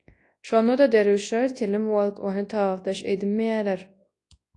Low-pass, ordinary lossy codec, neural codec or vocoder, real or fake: 10.8 kHz; AAC, 48 kbps; codec, 24 kHz, 0.9 kbps, WavTokenizer, large speech release; fake